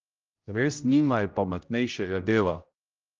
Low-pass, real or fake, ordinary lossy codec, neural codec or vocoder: 7.2 kHz; fake; Opus, 24 kbps; codec, 16 kHz, 0.5 kbps, X-Codec, HuBERT features, trained on general audio